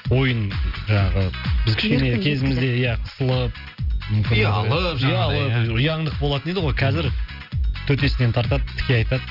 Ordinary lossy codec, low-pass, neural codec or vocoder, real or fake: none; 5.4 kHz; none; real